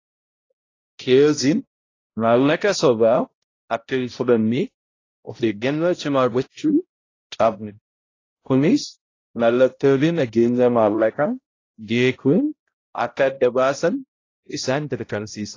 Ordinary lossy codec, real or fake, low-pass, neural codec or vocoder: AAC, 32 kbps; fake; 7.2 kHz; codec, 16 kHz, 0.5 kbps, X-Codec, HuBERT features, trained on balanced general audio